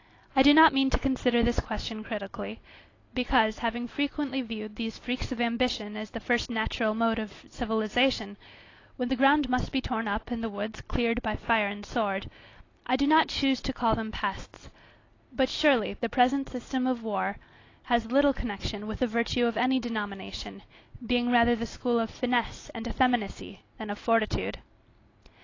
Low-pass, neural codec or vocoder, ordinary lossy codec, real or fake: 7.2 kHz; none; AAC, 32 kbps; real